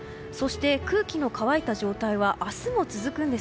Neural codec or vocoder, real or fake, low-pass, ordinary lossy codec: none; real; none; none